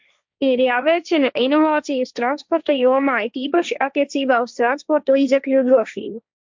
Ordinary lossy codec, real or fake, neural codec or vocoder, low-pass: MP3, 64 kbps; fake; codec, 16 kHz, 1.1 kbps, Voila-Tokenizer; 7.2 kHz